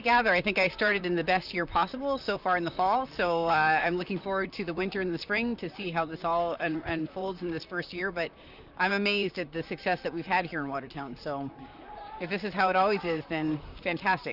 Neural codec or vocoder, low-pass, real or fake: vocoder, 44.1 kHz, 128 mel bands, Pupu-Vocoder; 5.4 kHz; fake